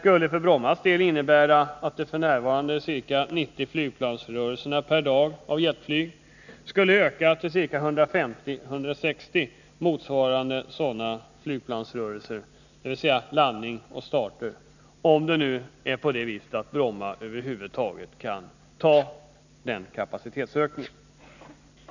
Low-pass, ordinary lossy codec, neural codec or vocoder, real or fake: 7.2 kHz; none; none; real